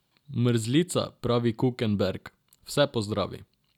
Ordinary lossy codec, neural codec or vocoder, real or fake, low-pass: none; none; real; 19.8 kHz